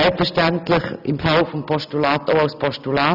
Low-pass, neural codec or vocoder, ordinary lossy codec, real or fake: 5.4 kHz; none; none; real